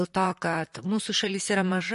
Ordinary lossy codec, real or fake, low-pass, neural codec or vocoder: MP3, 48 kbps; fake; 14.4 kHz; vocoder, 44.1 kHz, 128 mel bands, Pupu-Vocoder